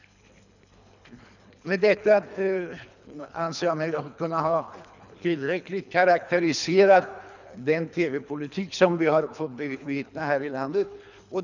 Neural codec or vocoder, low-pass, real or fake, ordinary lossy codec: codec, 24 kHz, 3 kbps, HILCodec; 7.2 kHz; fake; none